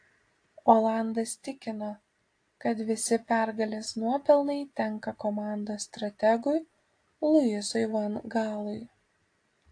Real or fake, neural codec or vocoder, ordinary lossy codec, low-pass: real; none; AAC, 48 kbps; 9.9 kHz